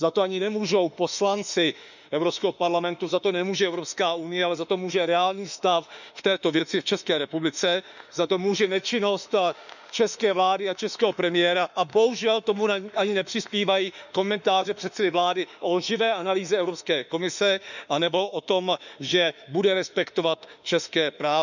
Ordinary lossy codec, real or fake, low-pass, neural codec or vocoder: none; fake; 7.2 kHz; autoencoder, 48 kHz, 32 numbers a frame, DAC-VAE, trained on Japanese speech